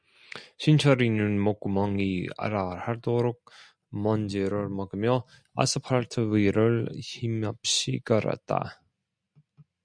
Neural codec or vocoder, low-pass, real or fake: none; 9.9 kHz; real